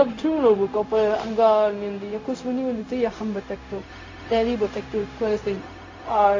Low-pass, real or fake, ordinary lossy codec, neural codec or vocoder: 7.2 kHz; fake; AAC, 32 kbps; codec, 16 kHz, 0.4 kbps, LongCat-Audio-Codec